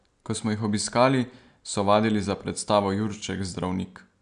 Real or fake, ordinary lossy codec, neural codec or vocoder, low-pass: real; none; none; 9.9 kHz